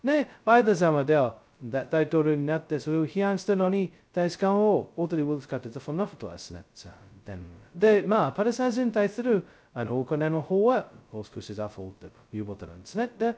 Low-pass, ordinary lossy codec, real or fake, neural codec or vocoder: none; none; fake; codec, 16 kHz, 0.2 kbps, FocalCodec